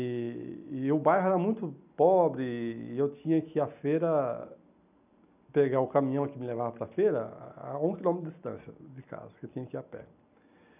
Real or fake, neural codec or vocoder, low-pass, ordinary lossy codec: real; none; 3.6 kHz; none